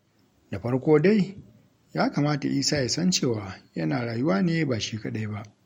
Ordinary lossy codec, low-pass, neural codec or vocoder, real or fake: MP3, 64 kbps; 19.8 kHz; none; real